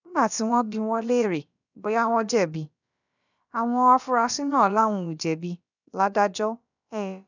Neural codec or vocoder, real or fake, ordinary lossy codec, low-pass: codec, 16 kHz, about 1 kbps, DyCAST, with the encoder's durations; fake; none; 7.2 kHz